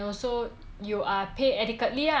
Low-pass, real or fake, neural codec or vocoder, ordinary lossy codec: none; real; none; none